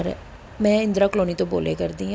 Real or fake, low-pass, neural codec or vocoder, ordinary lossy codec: real; none; none; none